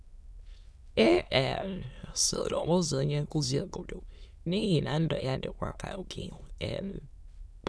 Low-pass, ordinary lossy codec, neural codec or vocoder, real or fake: none; none; autoencoder, 22.05 kHz, a latent of 192 numbers a frame, VITS, trained on many speakers; fake